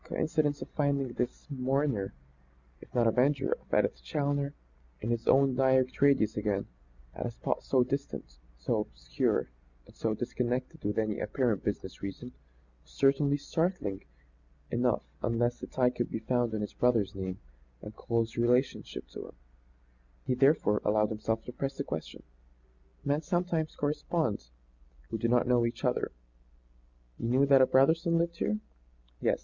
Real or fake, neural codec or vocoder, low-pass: real; none; 7.2 kHz